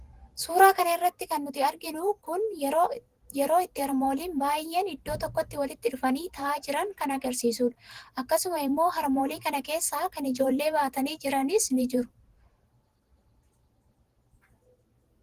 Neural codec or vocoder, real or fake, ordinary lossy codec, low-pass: vocoder, 44.1 kHz, 128 mel bands every 512 samples, BigVGAN v2; fake; Opus, 16 kbps; 14.4 kHz